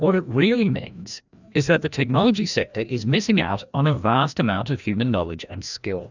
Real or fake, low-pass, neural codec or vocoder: fake; 7.2 kHz; codec, 16 kHz, 1 kbps, FreqCodec, larger model